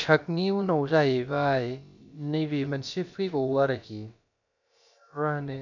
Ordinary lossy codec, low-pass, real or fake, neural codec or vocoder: none; 7.2 kHz; fake; codec, 16 kHz, about 1 kbps, DyCAST, with the encoder's durations